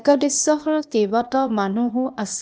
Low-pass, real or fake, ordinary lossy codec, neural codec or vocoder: none; fake; none; codec, 16 kHz, 0.8 kbps, ZipCodec